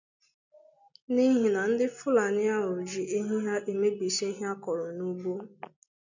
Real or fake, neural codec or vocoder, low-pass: real; none; 7.2 kHz